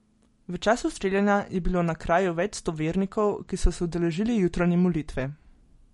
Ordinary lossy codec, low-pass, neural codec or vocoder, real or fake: MP3, 48 kbps; 19.8 kHz; none; real